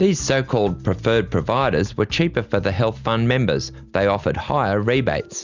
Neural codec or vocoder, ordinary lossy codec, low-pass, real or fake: none; Opus, 64 kbps; 7.2 kHz; real